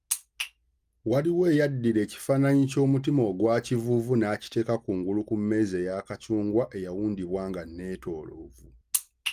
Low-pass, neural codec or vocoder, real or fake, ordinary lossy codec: 14.4 kHz; none; real; Opus, 32 kbps